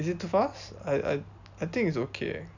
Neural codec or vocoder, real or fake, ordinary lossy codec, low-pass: none; real; none; 7.2 kHz